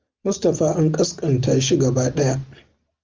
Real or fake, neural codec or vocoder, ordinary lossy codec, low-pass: real; none; Opus, 24 kbps; 7.2 kHz